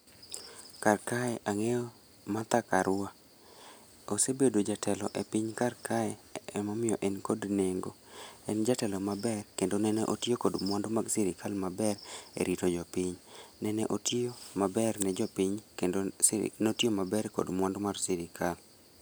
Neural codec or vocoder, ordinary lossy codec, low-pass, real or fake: none; none; none; real